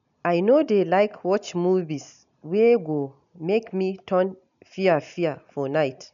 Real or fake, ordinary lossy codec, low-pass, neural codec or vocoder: real; none; 7.2 kHz; none